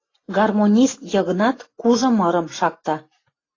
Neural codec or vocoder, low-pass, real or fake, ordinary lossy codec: none; 7.2 kHz; real; AAC, 32 kbps